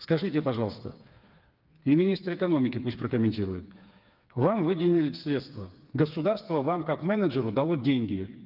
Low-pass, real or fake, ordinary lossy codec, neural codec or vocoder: 5.4 kHz; fake; Opus, 24 kbps; codec, 16 kHz, 4 kbps, FreqCodec, smaller model